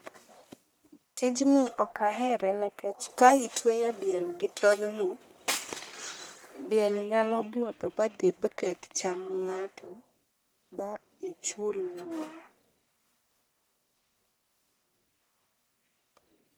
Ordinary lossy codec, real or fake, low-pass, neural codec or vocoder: none; fake; none; codec, 44.1 kHz, 1.7 kbps, Pupu-Codec